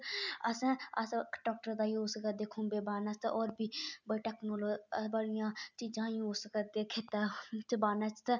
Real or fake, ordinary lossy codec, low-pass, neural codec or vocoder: real; none; 7.2 kHz; none